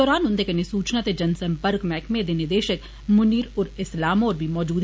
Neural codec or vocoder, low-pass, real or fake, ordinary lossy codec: none; none; real; none